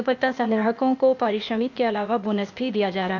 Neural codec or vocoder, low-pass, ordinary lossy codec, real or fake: codec, 16 kHz, 0.8 kbps, ZipCodec; 7.2 kHz; none; fake